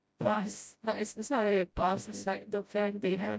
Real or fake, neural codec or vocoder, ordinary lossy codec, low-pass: fake; codec, 16 kHz, 0.5 kbps, FreqCodec, smaller model; none; none